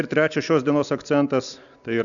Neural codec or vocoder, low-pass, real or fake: none; 7.2 kHz; real